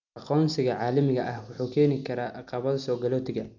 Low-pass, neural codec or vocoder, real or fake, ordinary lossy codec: 7.2 kHz; none; real; none